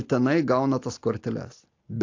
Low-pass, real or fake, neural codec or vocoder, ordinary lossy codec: 7.2 kHz; real; none; AAC, 48 kbps